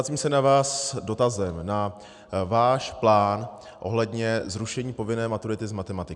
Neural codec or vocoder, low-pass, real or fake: none; 10.8 kHz; real